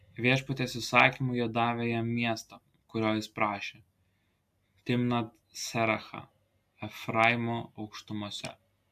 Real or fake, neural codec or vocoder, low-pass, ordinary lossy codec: real; none; 14.4 kHz; AAC, 96 kbps